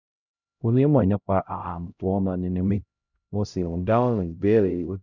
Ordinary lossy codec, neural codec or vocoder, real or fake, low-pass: none; codec, 16 kHz, 0.5 kbps, X-Codec, HuBERT features, trained on LibriSpeech; fake; 7.2 kHz